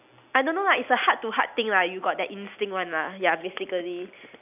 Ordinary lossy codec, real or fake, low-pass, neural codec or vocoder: none; real; 3.6 kHz; none